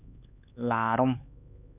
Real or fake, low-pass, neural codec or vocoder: fake; 3.6 kHz; codec, 16 kHz, 4 kbps, X-Codec, HuBERT features, trained on LibriSpeech